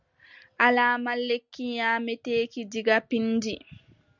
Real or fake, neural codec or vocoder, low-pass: real; none; 7.2 kHz